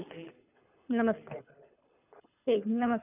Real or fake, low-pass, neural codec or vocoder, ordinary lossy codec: fake; 3.6 kHz; codec, 24 kHz, 6 kbps, HILCodec; none